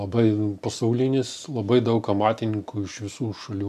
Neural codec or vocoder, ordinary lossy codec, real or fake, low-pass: none; MP3, 96 kbps; real; 14.4 kHz